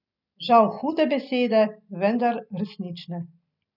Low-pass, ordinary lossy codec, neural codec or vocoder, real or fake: 5.4 kHz; none; none; real